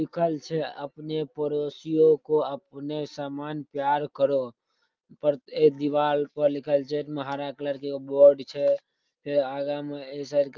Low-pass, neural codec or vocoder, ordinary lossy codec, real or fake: 7.2 kHz; none; Opus, 24 kbps; real